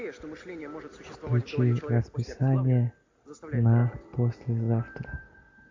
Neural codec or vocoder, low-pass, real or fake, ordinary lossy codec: none; 7.2 kHz; real; MP3, 48 kbps